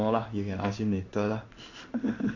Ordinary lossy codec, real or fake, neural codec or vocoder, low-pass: none; fake; codec, 16 kHz in and 24 kHz out, 1 kbps, XY-Tokenizer; 7.2 kHz